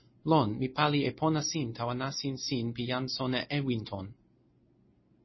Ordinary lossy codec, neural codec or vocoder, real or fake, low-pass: MP3, 24 kbps; none; real; 7.2 kHz